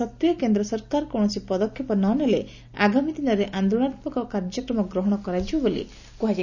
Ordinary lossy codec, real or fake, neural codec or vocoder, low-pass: none; real; none; 7.2 kHz